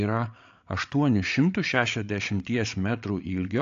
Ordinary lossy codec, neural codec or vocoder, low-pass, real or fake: AAC, 64 kbps; codec, 16 kHz, 8 kbps, FreqCodec, larger model; 7.2 kHz; fake